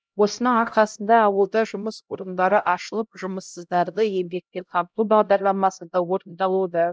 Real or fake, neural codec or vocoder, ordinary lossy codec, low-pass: fake; codec, 16 kHz, 0.5 kbps, X-Codec, HuBERT features, trained on LibriSpeech; none; none